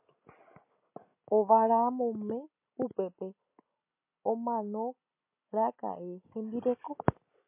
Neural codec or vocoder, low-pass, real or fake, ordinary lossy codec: none; 3.6 kHz; real; MP3, 24 kbps